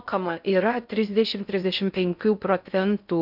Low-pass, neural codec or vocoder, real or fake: 5.4 kHz; codec, 16 kHz in and 24 kHz out, 0.6 kbps, FocalCodec, streaming, 4096 codes; fake